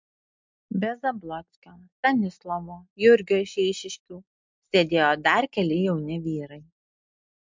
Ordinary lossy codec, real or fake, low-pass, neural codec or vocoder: MP3, 64 kbps; real; 7.2 kHz; none